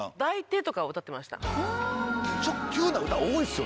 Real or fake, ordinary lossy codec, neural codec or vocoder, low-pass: real; none; none; none